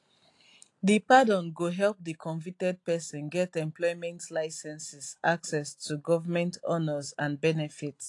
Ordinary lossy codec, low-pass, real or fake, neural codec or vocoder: AAC, 48 kbps; 10.8 kHz; real; none